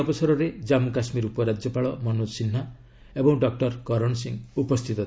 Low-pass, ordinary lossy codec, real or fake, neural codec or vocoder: none; none; real; none